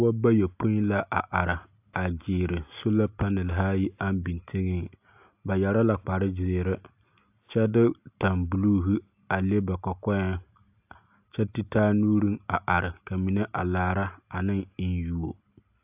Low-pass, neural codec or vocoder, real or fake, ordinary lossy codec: 3.6 kHz; none; real; AAC, 32 kbps